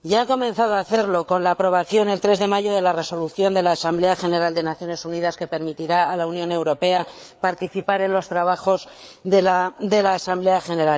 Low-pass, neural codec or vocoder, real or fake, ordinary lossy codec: none; codec, 16 kHz, 4 kbps, FreqCodec, larger model; fake; none